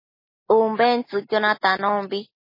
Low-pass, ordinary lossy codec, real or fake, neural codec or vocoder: 5.4 kHz; MP3, 24 kbps; real; none